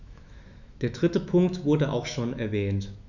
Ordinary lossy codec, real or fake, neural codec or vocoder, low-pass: none; fake; autoencoder, 48 kHz, 128 numbers a frame, DAC-VAE, trained on Japanese speech; 7.2 kHz